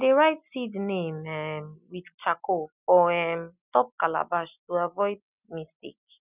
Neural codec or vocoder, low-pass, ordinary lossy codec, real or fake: none; 3.6 kHz; none; real